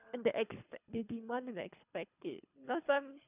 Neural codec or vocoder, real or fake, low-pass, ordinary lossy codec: codec, 24 kHz, 3 kbps, HILCodec; fake; 3.6 kHz; none